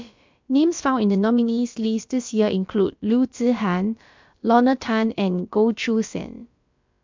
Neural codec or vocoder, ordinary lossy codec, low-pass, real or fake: codec, 16 kHz, about 1 kbps, DyCAST, with the encoder's durations; MP3, 64 kbps; 7.2 kHz; fake